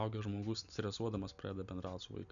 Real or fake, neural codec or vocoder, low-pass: real; none; 7.2 kHz